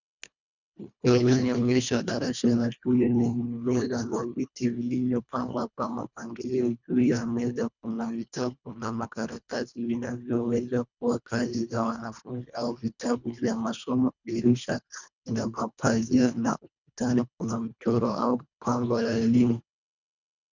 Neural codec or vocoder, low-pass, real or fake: codec, 24 kHz, 1.5 kbps, HILCodec; 7.2 kHz; fake